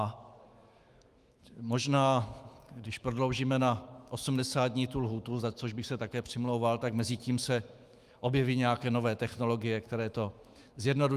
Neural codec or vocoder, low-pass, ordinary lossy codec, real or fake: autoencoder, 48 kHz, 128 numbers a frame, DAC-VAE, trained on Japanese speech; 14.4 kHz; Opus, 32 kbps; fake